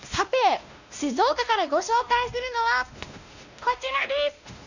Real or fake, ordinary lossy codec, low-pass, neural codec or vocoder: fake; none; 7.2 kHz; codec, 16 kHz, 1 kbps, X-Codec, WavLM features, trained on Multilingual LibriSpeech